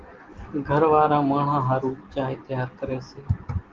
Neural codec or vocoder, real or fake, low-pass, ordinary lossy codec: none; real; 7.2 kHz; Opus, 16 kbps